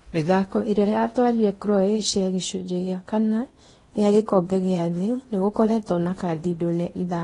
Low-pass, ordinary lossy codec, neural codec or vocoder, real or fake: 10.8 kHz; AAC, 32 kbps; codec, 16 kHz in and 24 kHz out, 0.8 kbps, FocalCodec, streaming, 65536 codes; fake